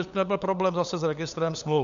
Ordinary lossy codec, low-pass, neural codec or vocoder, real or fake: Opus, 64 kbps; 7.2 kHz; codec, 16 kHz, 8 kbps, FunCodec, trained on LibriTTS, 25 frames a second; fake